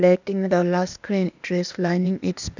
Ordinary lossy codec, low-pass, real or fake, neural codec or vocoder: none; 7.2 kHz; fake; codec, 16 kHz, 0.8 kbps, ZipCodec